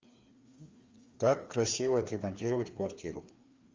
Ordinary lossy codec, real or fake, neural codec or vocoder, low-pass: Opus, 32 kbps; fake; codec, 16 kHz, 2 kbps, FreqCodec, larger model; 7.2 kHz